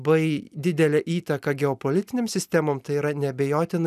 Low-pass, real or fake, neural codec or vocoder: 14.4 kHz; real; none